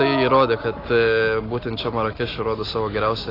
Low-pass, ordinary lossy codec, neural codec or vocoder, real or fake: 5.4 kHz; AAC, 24 kbps; none; real